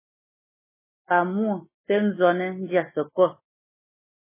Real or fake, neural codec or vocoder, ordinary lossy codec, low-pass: real; none; MP3, 16 kbps; 3.6 kHz